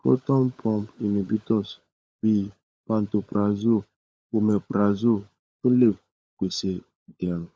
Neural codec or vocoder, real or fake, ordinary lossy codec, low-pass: codec, 16 kHz, 16 kbps, FunCodec, trained on LibriTTS, 50 frames a second; fake; none; none